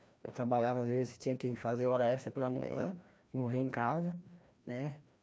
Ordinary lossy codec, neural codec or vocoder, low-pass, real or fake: none; codec, 16 kHz, 1 kbps, FreqCodec, larger model; none; fake